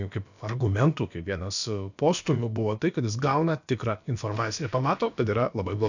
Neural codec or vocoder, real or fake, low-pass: codec, 16 kHz, about 1 kbps, DyCAST, with the encoder's durations; fake; 7.2 kHz